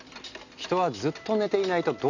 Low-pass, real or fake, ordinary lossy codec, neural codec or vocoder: 7.2 kHz; real; none; none